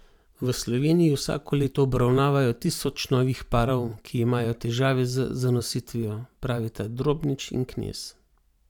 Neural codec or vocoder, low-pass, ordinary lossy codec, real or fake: vocoder, 44.1 kHz, 128 mel bands every 512 samples, BigVGAN v2; 19.8 kHz; none; fake